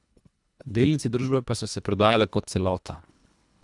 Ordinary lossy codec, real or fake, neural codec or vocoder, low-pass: none; fake; codec, 24 kHz, 1.5 kbps, HILCodec; 10.8 kHz